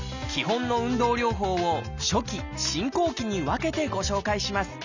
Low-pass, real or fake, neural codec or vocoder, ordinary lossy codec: 7.2 kHz; real; none; none